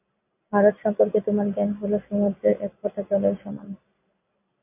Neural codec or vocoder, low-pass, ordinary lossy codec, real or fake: none; 3.6 kHz; AAC, 24 kbps; real